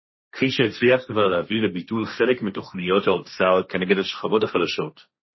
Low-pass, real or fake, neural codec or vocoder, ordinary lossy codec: 7.2 kHz; fake; codec, 16 kHz, 1.1 kbps, Voila-Tokenizer; MP3, 24 kbps